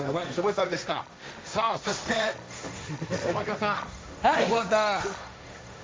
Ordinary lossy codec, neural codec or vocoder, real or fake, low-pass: none; codec, 16 kHz, 1.1 kbps, Voila-Tokenizer; fake; none